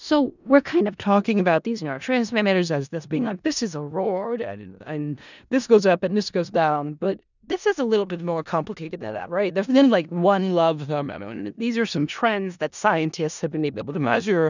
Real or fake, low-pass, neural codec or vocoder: fake; 7.2 kHz; codec, 16 kHz in and 24 kHz out, 0.4 kbps, LongCat-Audio-Codec, four codebook decoder